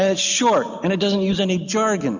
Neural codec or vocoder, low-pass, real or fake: none; 7.2 kHz; real